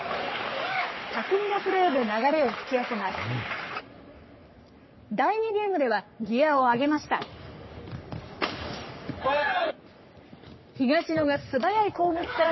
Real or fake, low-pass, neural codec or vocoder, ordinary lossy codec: fake; 7.2 kHz; codec, 44.1 kHz, 3.4 kbps, Pupu-Codec; MP3, 24 kbps